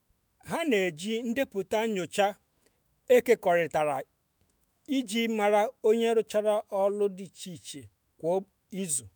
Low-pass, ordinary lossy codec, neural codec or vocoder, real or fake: none; none; autoencoder, 48 kHz, 128 numbers a frame, DAC-VAE, trained on Japanese speech; fake